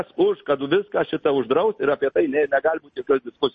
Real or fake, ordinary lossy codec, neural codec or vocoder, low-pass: real; MP3, 32 kbps; none; 10.8 kHz